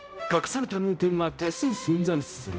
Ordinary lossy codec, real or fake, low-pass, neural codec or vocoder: none; fake; none; codec, 16 kHz, 0.5 kbps, X-Codec, HuBERT features, trained on balanced general audio